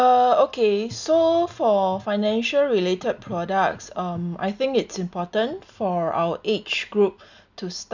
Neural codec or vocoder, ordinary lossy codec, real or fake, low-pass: none; none; real; 7.2 kHz